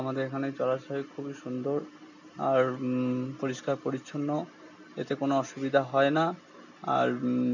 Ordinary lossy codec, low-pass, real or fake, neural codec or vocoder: none; 7.2 kHz; real; none